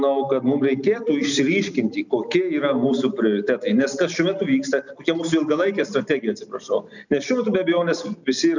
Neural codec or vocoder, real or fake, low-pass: none; real; 7.2 kHz